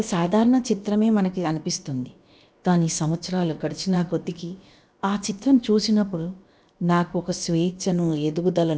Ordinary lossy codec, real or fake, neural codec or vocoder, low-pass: none; fake; codec, 16 kHz, about 1 kbps, DyCAST, with the encoder's durations; none